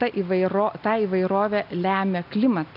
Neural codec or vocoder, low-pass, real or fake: none; 5.4 kHz; real